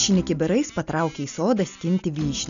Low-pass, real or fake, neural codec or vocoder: 7.2 kHz; real; none